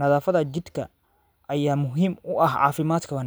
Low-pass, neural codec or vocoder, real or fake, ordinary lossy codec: none; none; real; none